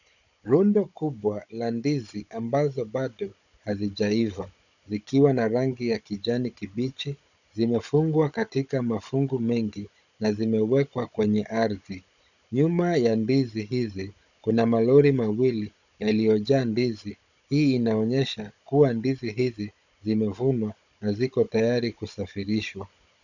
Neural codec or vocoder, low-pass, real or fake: codec, 16 kHz, 16 kbps, FunCodec, trained on Chinese and English, 50 frames a second; 7.2 kHz; fake